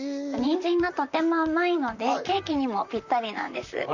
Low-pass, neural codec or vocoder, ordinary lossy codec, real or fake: 7.2 kHz; vocoder, 44.1 kHz, 128 mel bands, Pupu-Vocoder; none; fake